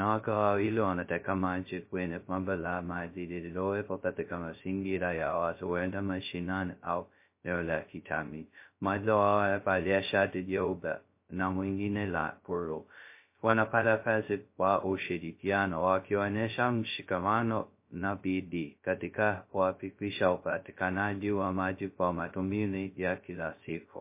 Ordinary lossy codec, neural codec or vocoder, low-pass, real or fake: MP3, 24 kbps; codec, 16 kHz, 0.2 kbps, FocalCodec; 3.6 kHz; fake